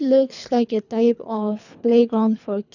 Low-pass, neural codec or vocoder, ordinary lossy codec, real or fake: 7.2 kHz; codec, 24 kHz, 3 kbps, HILCodec; none; fake